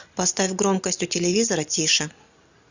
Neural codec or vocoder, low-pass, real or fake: none; 7.2 kHz; real